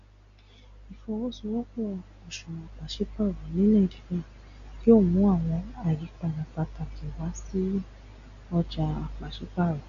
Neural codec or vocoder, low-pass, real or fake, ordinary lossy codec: none; 7.2 kHz; real; none